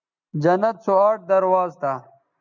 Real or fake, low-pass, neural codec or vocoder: real; 7.2 kHz; none